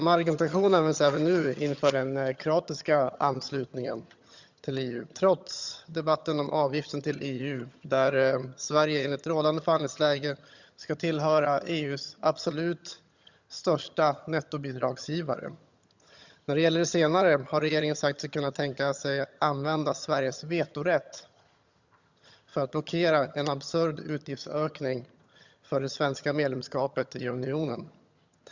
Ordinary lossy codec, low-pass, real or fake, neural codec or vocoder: Opus, 64 kbps; 7.2 kHz; fake; vocoder, 22.05 kHz, 80 mel bands, HiFi-GAN